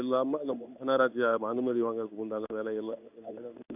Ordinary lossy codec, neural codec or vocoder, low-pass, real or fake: none; codec, 16 kHz in and 24 kHz out, 1 kbps, XY-Tokenizer; 3.6 kHz; fake